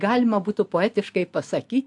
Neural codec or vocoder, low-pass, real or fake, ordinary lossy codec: none; 10.8 kHz; real; AAC, 64 kbps